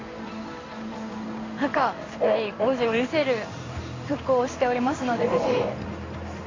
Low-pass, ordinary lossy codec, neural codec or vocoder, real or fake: 7.2 kHz; AAC, 32 kbps; codec, 16 kHz in and 24 kHz out, 1 kbps, XY-Tokenizer; fake